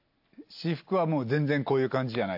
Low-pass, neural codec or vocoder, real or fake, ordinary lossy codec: 5.4 kHz; none; real; AAC, 48 kbps